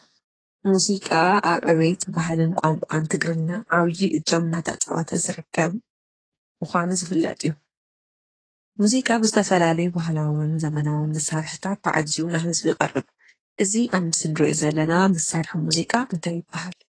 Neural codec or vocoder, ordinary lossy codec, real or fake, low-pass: codec, 32 kHz, 1.9 kbps, SNAC; AAC, 32 kbps; fake; 9.9 kHz